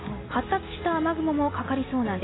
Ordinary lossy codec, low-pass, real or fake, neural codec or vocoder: AAC, 16 kbps; 7.2 kHz; real; none